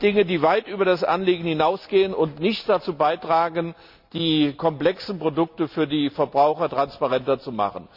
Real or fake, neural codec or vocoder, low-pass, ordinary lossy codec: real; none; 5.4 kHz; none